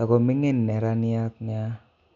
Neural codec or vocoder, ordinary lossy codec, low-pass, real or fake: none; none; 7.2 kHz; real